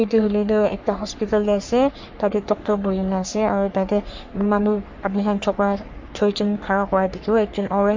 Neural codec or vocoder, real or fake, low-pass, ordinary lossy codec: codec, 44.1 kHz, 3.4 kbps, Pupu-Codec; fake; 7.2 kHz; MP3, 48 kbps